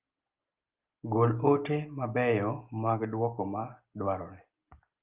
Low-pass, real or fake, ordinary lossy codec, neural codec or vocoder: 3.6 kHz; real; Opus, 24 kbps; none